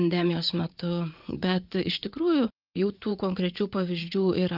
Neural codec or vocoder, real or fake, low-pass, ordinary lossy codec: vocoder, 44.1 kHz, 80 mel bands, Vocos; fake; 5.4 kHz; Opus, 24 kbps